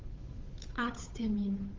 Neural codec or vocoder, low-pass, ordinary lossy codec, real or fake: codec, 16 kHz, 8 kbps, FunCodec, trained on Chinese and English, 25 frames a second; 7.2 kHz; Opus, 32 kbps; fake